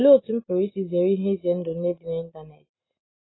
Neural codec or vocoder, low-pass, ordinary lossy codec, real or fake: none; 7.2 kHz; AAC, 16 kbps; real